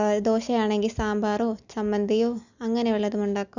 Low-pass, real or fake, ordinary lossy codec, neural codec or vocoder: 7.2 kHz; real; none; none